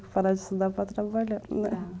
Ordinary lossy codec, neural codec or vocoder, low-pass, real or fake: none; none; none; real